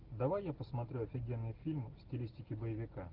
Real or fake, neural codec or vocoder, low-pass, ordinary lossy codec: real; none; 5.4 kHz; Opus, 16 kbps